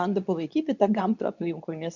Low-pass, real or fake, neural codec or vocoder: 7.2 kHz; fake; codec, 24 kHz, 0.9 kbps, WavTokenizer, medium speech release version 2